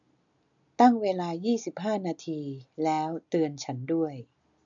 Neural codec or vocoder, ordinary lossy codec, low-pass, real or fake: none; none; 7.2 kHz; real